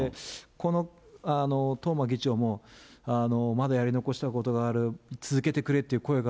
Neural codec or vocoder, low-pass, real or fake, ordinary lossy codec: none; none; real; none